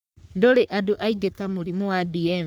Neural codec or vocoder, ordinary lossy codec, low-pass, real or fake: codec, 44.1 kHz, 3.4 kbps, Pupu-Codec; none; none; fake